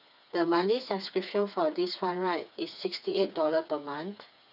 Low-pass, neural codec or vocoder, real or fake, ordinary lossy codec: 5.4 kHz; codec, 16 kHz, 4 kbps, FreqCodec, smaller model; fake; none